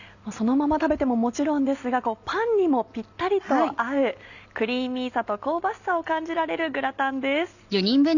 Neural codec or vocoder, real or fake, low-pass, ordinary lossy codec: none; real; 7.2 kHz; none